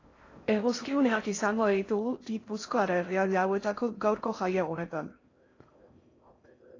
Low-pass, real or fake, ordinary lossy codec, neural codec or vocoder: 7.2 kHz; fake; AAC, 32 kbps; codec, 16 kHz in and 24 kHz out, 0.6 kbps, FocalCodec, streaming, 4096 codes